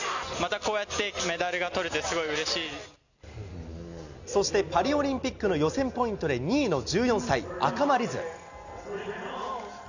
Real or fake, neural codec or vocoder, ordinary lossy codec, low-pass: real; none; none; 7.2 kHz